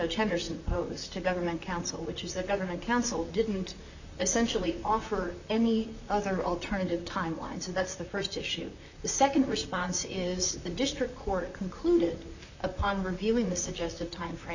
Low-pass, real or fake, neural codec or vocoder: 7.2 kHz; fake; vocoder, 44.1 kHz, 128 mel bands, Pupu-Vocoder